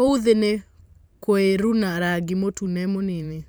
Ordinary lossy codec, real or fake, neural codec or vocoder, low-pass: none; fake; vocoder, 44.1 kHz, 128 mel bands every 512 samples, BigVGAN v2; none